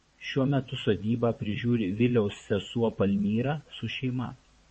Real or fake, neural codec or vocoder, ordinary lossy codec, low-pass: fake; vocoder, 22.05 kHz, 80 mel bands, WaveNeXt; MP3, 32 kbps; 9.9 kHz